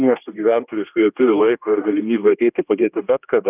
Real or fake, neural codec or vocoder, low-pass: fake; codec, 16 kHz, 1 kbps, X-Codec, HuBERT features, trained on general audio; 3.6 kHz